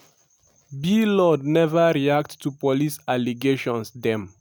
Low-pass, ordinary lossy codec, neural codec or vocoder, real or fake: none; none; none; real